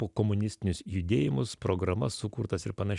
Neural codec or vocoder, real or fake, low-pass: none; real; 10.8 kHz